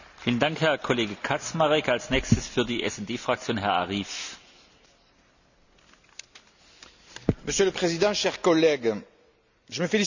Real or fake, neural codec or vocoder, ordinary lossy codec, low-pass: real; none; none; 7.2 kHz